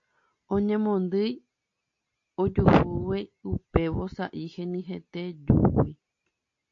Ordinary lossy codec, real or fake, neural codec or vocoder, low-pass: AAC, 48 kbps; real; none; 7.2 kHz